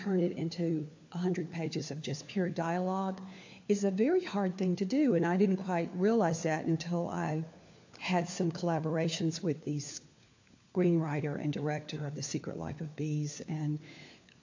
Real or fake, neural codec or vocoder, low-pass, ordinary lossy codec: fake; codec, 16 kHz, 4 kbps, FunCodec, trained on LibriTTS, 50 frames a second; 7.2 kHz; AAC, 48 kbps